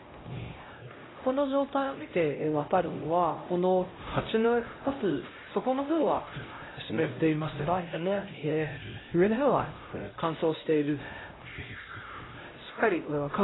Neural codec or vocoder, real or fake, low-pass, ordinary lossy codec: codec, 16 kHz, 0.5 kbps, X-Codec, HuBERT features, trained on LibriSpeech; fake; 7.2 kHz; AAC, 16 kbps